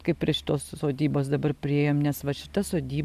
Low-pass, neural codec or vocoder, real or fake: 14.4 kHz; none; real